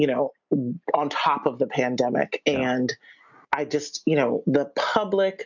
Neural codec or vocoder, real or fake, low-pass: none; real; 7.2 kHz